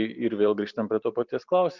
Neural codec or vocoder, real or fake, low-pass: none; real; 7.2 kHz